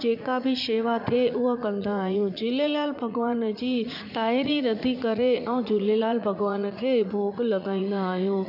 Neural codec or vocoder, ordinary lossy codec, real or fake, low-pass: vocoder, 44.1 kHz, 80 mel bands, Vocos; none; fake; 5.4 kHz